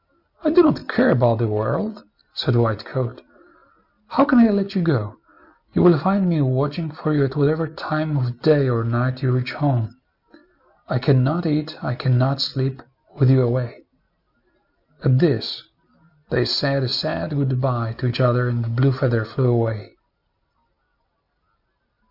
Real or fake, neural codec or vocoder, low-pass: real; none; 5.4 kHz